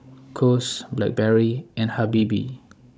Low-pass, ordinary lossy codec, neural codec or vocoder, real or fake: none; none; codec, 16 kHz, 16 kbps, FunCodec, trained on Chinese and English, 50 frames a second; fake